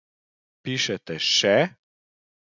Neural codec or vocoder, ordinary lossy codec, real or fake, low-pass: none; none; real; 7.2 kHz